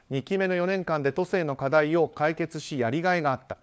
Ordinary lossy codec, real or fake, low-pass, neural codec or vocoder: none; fake; none; codec, 16 kHz, 4 kbps, FunCodec, trained on LibriTTS, 50 frames a second